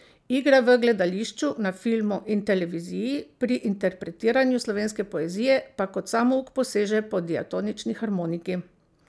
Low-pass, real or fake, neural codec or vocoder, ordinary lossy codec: none; real; none; none